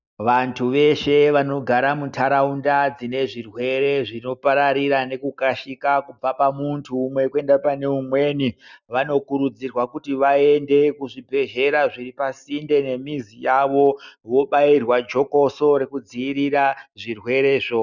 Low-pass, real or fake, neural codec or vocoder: 7.2 kHz; real; none